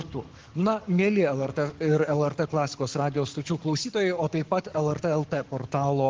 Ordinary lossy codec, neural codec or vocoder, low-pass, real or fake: Opus, 16 kbps; codec, 44.1 kHz, 7.8 kbps, Pupu-Codec; 7.2 kHz; fake